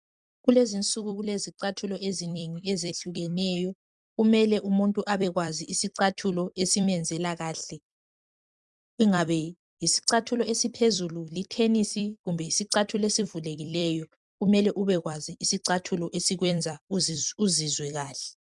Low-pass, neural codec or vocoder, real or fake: 10.8 kHz; vocoder, 44.1 kHz, 128 mel bands every 256 samples, BigVGAN v2; fake